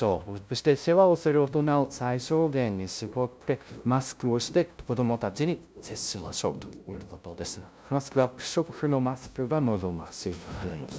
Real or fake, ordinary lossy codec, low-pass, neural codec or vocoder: fake; none; none; codec, 16 kHz, 0.5 kbps, FunCodec, trained on LibriTTS, 25 frames a second